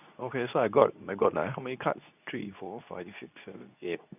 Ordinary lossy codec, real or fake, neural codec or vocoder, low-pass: none; fake; codec, 24 kHz, 0.9 kbps, WavTokenizer, medium speech release version 2; 3.6 kHz